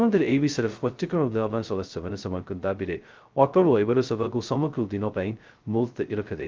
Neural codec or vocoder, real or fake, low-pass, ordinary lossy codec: codec, 16 kHz, 0.2 kbps, FocalCodec; fake; 7.2 kHz; Opus, 32 kbps